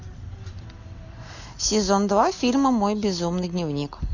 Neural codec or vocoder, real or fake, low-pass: none; real; 7.2 kHz